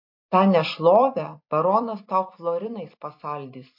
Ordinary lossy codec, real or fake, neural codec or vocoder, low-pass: MP3, 32 kbps; fake; vocoder, 44.1 kHz, 128 mel bands every 256 samples, BigVGAN v2; 5.4 kHz